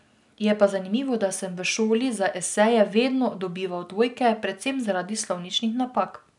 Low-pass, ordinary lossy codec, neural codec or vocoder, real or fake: 10.8 kHz; none; none; real